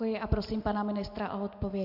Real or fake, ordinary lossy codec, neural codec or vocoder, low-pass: real; AAC, 48 kbps; none; 5.4 kHz